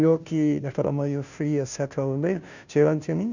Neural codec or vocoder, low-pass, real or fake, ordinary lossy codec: codec, 16 kHz, 0.5 kbps, FunCodec, trained on Chinese and English, 25 frames a second; 7.2 kHz; fake; none